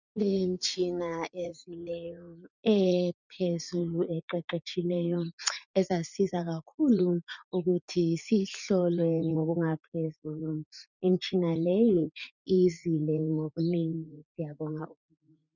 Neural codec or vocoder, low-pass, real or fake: vocoder, 44.1 kHz, 80 mel bands, Vocos; 7.2 kHz; fake